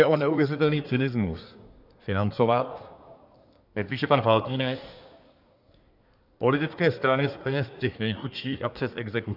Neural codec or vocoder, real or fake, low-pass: codec, 24 kHz, 1 kbps, SNAC; fake; 5.4 kHz